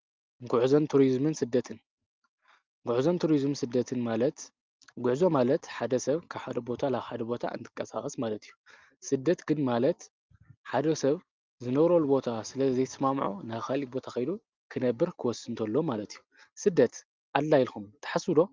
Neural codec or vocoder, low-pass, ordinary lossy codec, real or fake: none; 7.2 kHz; Opus, 32 kbps; real